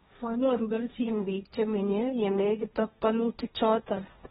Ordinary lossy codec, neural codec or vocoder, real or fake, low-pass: AAC, 16 kbps; codec, 16 kHz, 1.1 kbps, Voila-Tokenizer; fake; 7.2 kHz